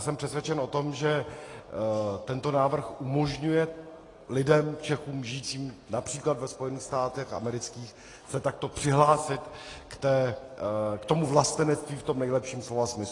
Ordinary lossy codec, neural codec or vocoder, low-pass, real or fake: AAC, 32 kbps; none; 10.8 kHz; real